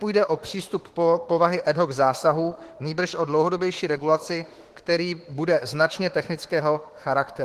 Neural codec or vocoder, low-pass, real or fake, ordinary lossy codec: autoencoder, 48 kHz, 32 numbers a frame, DAC-VAE, trained on Japanese speech; 14.4 kHz; fake; Opus, 16 kbps